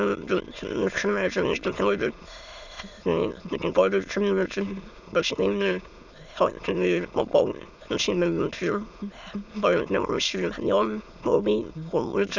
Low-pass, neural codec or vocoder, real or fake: 7.2 kHz; autoencoder, 22.05 kHz, a latent of 192 numbers a frame, VITS, trained on many speakers; fake